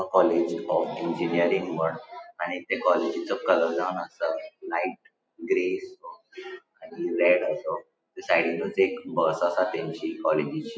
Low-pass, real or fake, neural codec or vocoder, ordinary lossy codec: none; real; none; none